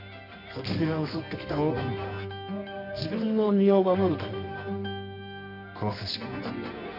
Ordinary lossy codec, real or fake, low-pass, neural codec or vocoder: none; fake; 5.4 kHz; codec, 24 kHz, 0.9 kbps, WavTokenizer, medium music audio release